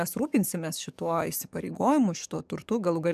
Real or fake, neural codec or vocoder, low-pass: fake; codec, 44.1 kHz, 7.8 kbps, DAC; 14.4 kHz